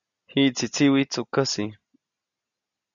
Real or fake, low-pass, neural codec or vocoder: real; 7.2 kHz; none